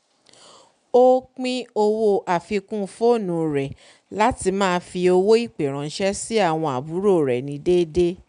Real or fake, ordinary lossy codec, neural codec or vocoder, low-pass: real; none; none; 9.9 kHz